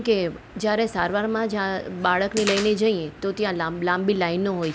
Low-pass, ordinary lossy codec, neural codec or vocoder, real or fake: none; none; none; real